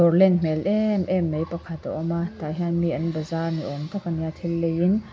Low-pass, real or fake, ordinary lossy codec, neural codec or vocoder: none; real; none; none